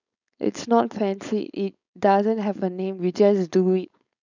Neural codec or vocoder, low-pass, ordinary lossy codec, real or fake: codec, 16 kHz, 4.8 kbps, FACodec; 7.2 kHz; none; fake